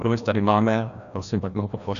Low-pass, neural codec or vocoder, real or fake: 7.2 kHz; codec, 16 kHz, 1 kbps, FreqCodec, larger model; fake